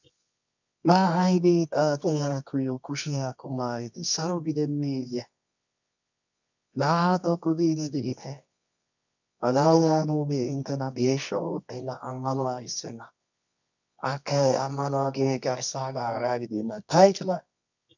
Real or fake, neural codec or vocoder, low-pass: fake; codec, 24 kHz, 0.9 kbps, WavTokenizer, medium music audio release; 7.2 kHz